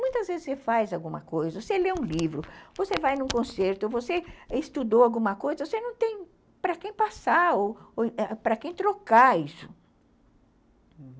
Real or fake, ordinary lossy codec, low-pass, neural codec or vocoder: real; none; none; none